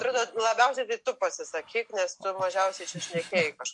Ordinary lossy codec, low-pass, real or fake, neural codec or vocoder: MP3, 48 kbps; 10.8 kHz; real; none